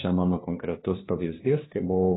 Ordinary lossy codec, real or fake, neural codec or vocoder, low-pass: AAC, 16 kbps; fake; codec, 16 kHz, 2 kbps, FunCodec, trained on LibriTTS, 25 frames a second; 7.2 kHz